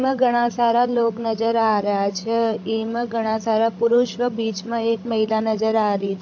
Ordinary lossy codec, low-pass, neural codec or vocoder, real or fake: AAC, 48 kbps; 7.2 kHz; codec, 16 kHz, 16 kbps, FreqCodec, larger model; fake